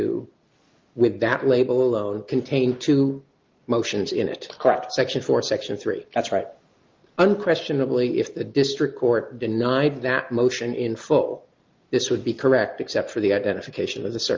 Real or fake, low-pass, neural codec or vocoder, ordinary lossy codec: real; 7.2 kHz; none; Opus, 32 kbps